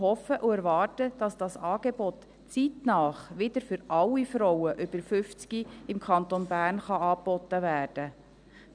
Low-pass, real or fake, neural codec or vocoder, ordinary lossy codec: 9.9 kHz; real; none; none